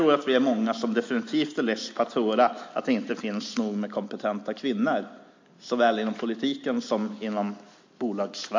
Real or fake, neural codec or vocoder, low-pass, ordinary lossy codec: real; none; 7.2 kHz; MP3, 48 kbps